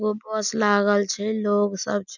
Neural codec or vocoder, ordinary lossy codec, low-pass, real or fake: none; none; 7.2 kHz; real